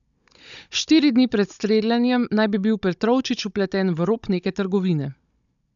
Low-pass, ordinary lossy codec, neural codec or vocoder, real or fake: 7.2 kHz; none; codec, 16 kHz, 16 kbps, FunCodec, trained on Chinese and English, 50 frames a second; fake